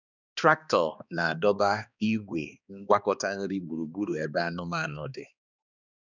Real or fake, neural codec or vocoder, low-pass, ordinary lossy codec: fake; codec, 16 kHz, 2 kbps, X-Codec, HuBERT features, trained on balanced general audio; 7.2 kHz; none